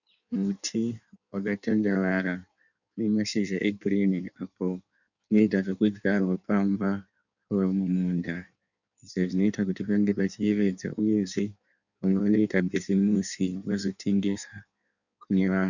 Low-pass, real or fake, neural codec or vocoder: 7.2 kHz; fake; codec, 16 kHz in and 24 kHz out, 1.1 kbps, FireRedTTS-2 codec